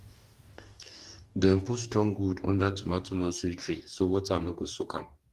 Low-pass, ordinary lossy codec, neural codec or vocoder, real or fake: 14.4 kHz; Opus, 24 kbps; codec, 44.1 kHz, 2.6 kbps, DAC; fake